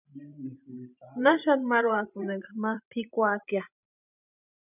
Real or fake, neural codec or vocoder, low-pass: fake; vocoder, 44.1 kHz, 128 mel bands every 512 samples, BigVGAN v2; 3.6 kHz